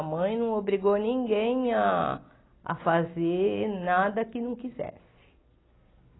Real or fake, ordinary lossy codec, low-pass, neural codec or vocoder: real; AAC, 16 kbps; 7.2 kHz; none